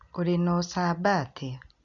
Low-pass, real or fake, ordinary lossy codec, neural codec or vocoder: 7.2 kHz; real; none; none